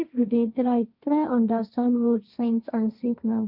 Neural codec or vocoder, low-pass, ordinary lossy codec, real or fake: codec, 16 kHz, 1.1 kbps, Voila-Tokenizer; 5.4 kHz; none; fake